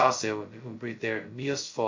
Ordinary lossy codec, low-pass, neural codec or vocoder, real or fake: MP3, 48 kbps; 7.2 kHz; codec, 16 kHz, 0.2 kbps, FocalCodec; fake